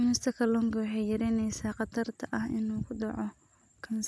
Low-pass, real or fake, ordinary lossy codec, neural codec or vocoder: none; real; none; none